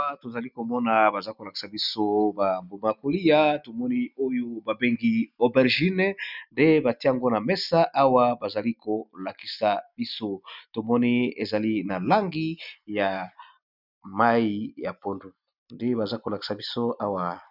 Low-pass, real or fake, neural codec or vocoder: 5.4 kHz; real; none